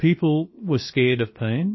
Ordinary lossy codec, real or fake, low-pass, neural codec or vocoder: MP3, 24 kbps; fake; 7.2 kHz; codec, 16 kHz, about 1 kbps, DyCAST, with the encoder's durations